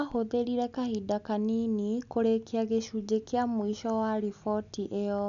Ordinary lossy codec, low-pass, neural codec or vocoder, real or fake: none; 7.2 kHz; none; real